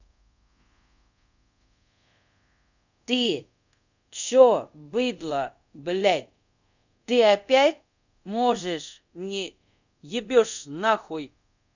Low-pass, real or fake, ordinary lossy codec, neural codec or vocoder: 7.2 kHz; fake; none; codec, 24 kHz, 0.5 kbps, DualCodec